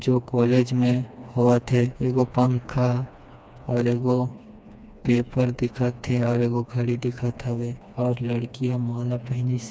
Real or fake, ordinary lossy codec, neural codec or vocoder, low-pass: fake; none; codec, 16 kHz, 2 kbps, FreqCodec, smaller model; none